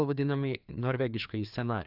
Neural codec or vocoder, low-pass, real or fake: codec, 16 kHz, 2 kbps, FreqCodec, larger model; 5.4 kHz; fake